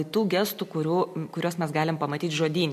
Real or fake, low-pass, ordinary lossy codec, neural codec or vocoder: real; 14.4 kHz; MP3, 64 kbps; none